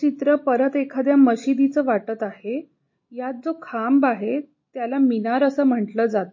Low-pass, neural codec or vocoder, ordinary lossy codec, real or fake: 7.2 kHz; none; MP3, 32 kbps; real